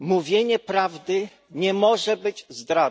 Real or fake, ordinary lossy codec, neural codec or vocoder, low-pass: real; none; none; none